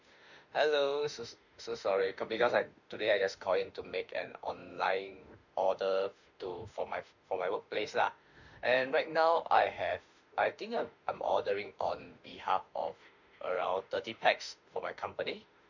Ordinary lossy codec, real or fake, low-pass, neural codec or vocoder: none; fake; 7.2 kHz; autoencoder, 48 kHz, 32 numbers a frame, DAC-VAE, trained on Japanese speech